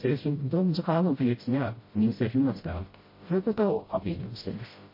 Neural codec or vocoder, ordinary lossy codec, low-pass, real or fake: codec, 16 kHz, 0.5 kbps, FreqCodec, smaller model; MP3, 24 kbps; 5.4 kHz; fake